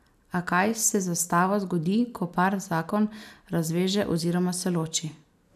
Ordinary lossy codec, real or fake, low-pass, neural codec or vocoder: none; real; 14.4 kHz; none